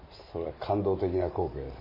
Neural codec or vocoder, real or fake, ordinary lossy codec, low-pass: none; real; MP3, 24 kbps; 5.4 kHz